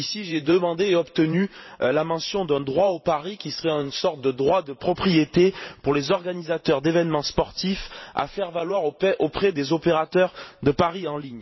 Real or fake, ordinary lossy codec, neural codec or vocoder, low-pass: fake; MP3, 24 kbps; vocoder, 44.1 kHz, 128 mel bands every 512 samples, BigVGAN v2; 7.2 kHz